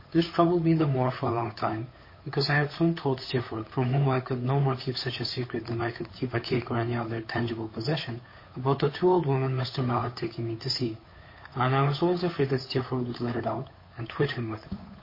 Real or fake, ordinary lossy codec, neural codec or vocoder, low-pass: fake; MP3, 24 kbps; codec, 16 kHz, 8 kbps, FunCodec, trained on Chinese and English, 25 frames a second; 5.4 kHz